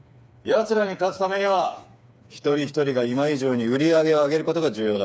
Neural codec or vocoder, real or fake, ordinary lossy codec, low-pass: codec, 16 kHz, 4 kbps, FreqCodec, smaller model; fake; none; none